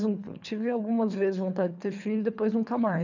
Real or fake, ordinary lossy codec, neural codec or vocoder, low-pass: fake; none; codec, 24 kHz, 3 kbps, HILCodec; 7.2 kHz